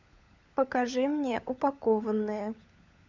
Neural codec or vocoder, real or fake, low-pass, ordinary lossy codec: codec, 16 kHz, 16 kbps, FunCodec, trained on LibriTTS, 50 frames a second; fake; 7.2 kHz; MP3, 64 kbps